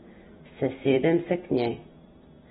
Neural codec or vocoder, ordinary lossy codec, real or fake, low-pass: vocoder, 44.1 kHz, 128 mel bands every 256 samples, BigVGAN v2; AAC, 16 kbps; fake; 19.8 kHz